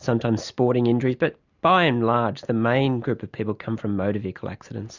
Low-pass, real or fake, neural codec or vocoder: 7.2 kHz; real; none